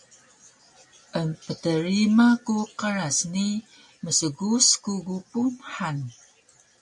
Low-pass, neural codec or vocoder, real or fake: 10.8 kHz; none; real